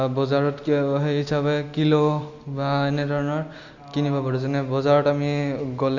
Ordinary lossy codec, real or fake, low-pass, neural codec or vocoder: Opus, 64 kbps; real; 7.2 kHz; none